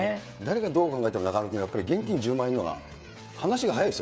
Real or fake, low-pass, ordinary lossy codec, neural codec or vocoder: fake; none; none; codec, 16 kHz, 16 kbps, FreqCodec, smaller model